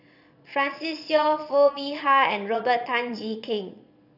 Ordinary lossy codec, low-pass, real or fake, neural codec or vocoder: none; 5.4 kHz; fake; vocoder, 22.05 kHz, 80 mel bands, Vocos